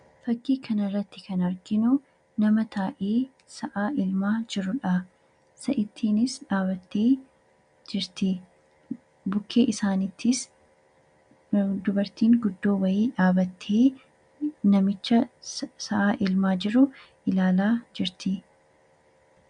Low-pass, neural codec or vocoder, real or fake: 9.9 kHz; none; real